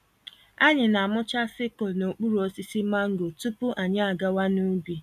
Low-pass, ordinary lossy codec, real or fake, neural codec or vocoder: 14.4 kHz; none; real; none